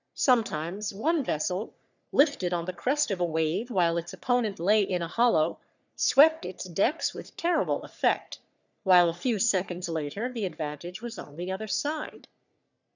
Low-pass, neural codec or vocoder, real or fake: 7.2 kHz; codec, 44.1 kHz, 3.4 kbps, Pupu-Codec; fake